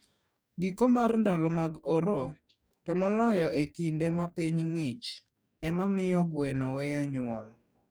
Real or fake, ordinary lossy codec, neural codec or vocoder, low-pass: fake; none; codec, 44.1 kHz, 2.6 kbps, DAC; none